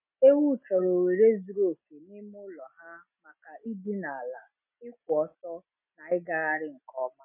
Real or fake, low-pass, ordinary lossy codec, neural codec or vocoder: real; 3.6 kHz; MP3, 32 kbps; none